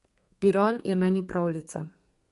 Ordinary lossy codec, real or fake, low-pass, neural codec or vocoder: MP3, 48 kbps; fake; 14.4 kHz; codec, 32 kHz, 1.9 kbps, SNAC